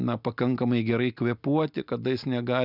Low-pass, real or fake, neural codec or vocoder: 5.4 kHz; real; none